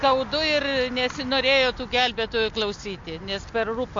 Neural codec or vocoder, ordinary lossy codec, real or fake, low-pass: none; MP3, 48 kbps; real; 7.2 kHz